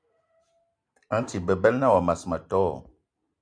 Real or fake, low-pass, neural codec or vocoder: real; 9.9 kHz; none